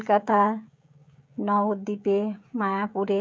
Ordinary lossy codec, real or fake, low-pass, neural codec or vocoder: none; fake; none; codec, 16 kHz, 16 kbps, FreqCodec, smaller model